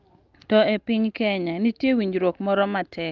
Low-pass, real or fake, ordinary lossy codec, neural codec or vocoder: 7.2 kHz; real; Opus, 32 kbps; none